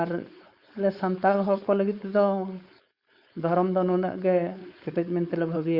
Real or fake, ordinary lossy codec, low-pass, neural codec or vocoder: fake; Opus, 64 kbps; 5.4 kHz; codec, 16 kHz, 4.8 kbps, FACodec